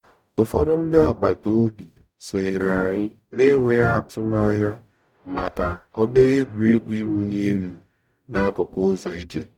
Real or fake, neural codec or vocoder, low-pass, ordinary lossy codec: fake; codec, 44.1 kHz, 0.9 kbps, DAC; 19.8 kHz; MP3, 96 kbps